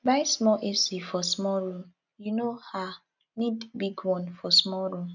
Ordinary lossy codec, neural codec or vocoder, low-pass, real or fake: none; none; 7.2 kHz; real